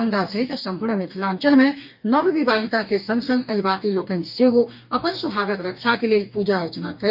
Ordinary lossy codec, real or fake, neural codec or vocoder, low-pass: none; fake; codec, 44.1 kHz, 2.6 kbps, DAC; 5.4 kHz